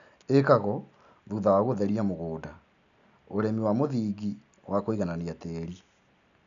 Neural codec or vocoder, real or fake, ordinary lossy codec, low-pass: none; real; none; 7.2 kHz